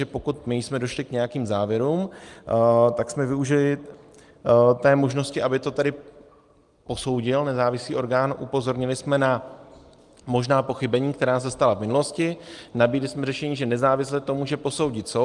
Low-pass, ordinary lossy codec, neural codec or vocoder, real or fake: 10.8 kHz; Opus, 32 kbps; none; real